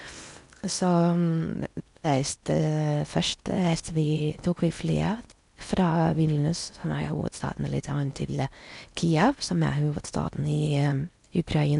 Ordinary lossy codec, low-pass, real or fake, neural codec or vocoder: none; 10.8 kHz; fake; codec, 16 kHz in and 24 kHz out, 0.8 kbps, FocalCodec, streaming, 65536 codes